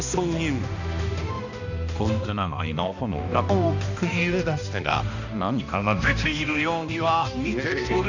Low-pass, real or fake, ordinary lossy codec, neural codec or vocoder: 7.2 kHz; fake; none; codec, 16 kHz, 1 kbps, X-Codec, HuBERT features, trained on balanced general audio